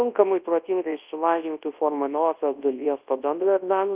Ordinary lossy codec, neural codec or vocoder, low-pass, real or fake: Opus, 32 kbps; codec, 24 kHz, 0.9 kbps, WavTokenizer, large speech release; 3.6 kHz; fake